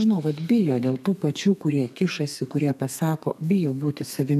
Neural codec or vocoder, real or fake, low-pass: codec, 32 kHz, 1.9 kbps, SNAC; fake; 14.4 kHz